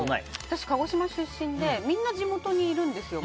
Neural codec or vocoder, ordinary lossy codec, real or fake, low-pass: none; none; real; none